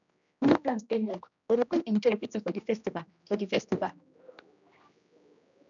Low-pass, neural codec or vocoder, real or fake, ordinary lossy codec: 7.2 kHz; codec, 16 kHz, 1 kbps, X-Codec, HuBERT features, trained on general audio; fake; none